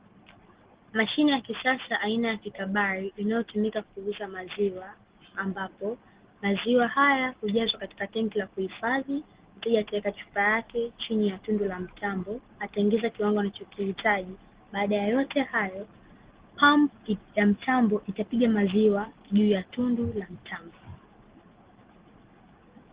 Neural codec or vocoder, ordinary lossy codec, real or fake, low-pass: none; Opus, 16 kbps; real; 3.6 kHz